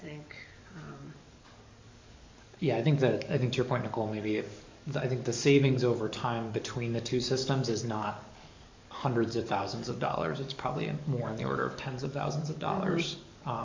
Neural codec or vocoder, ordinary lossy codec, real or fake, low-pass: codec, 44.1 kHz, 7.8 kbps, DAC; MP3, 64 kbps; fake; 7.2 kHz